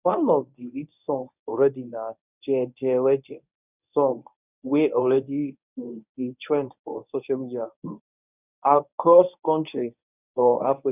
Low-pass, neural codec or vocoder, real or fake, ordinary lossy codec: 3.6 kHz; codec, 24 kHz, 0.9 kbps, WavTokenizer, medium speech release version 1; fake; none